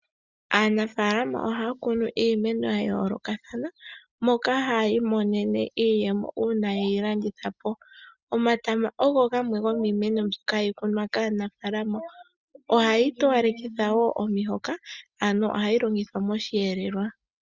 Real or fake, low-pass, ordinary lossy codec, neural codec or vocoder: real; 7.2 kHz; Opus, 64 kbps; none